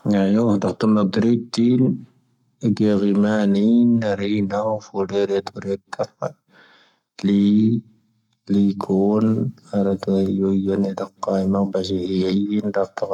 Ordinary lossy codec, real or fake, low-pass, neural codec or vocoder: none; fake; 19.8 kHz; codec, 44.1 kHz, 7.8 kbps, Pupu-Codec